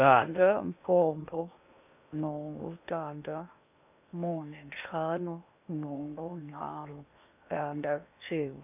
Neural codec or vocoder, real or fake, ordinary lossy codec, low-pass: codec, 16 kHz in and 24 kHz out, 0.8 kbps, FocalCodec, streaming, 65536 codes; fake; none; 3.6 kHz